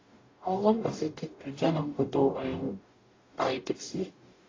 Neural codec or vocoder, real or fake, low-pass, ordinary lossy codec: codec, 44.1 kHz, 0.9 kbps, DAC; fake; 7.2 kHz; AAC, 32 kbps